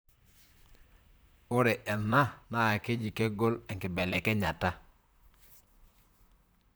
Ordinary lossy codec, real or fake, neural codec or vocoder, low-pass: none; fake; vocoder, 44.1 kHz, 128 mel bands, Pupu-Vocoder; none